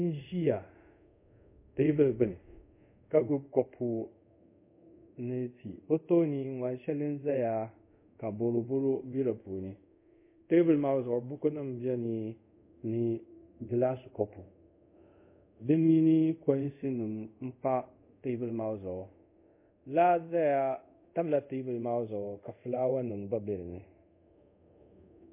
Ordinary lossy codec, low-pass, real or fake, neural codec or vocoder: MP3, 24 kbps; 3.6 kHz; fake; codec, 24 kHz, 0.5 kbps, DualCodec